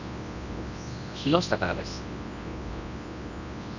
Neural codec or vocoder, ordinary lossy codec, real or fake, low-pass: codec, 24 kHz, 0.9 kbps, WavTokenizer, large speech release; none; fake; 7.2 kHz